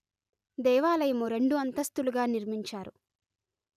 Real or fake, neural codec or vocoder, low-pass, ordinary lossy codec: real; none; 14.4 kHz; none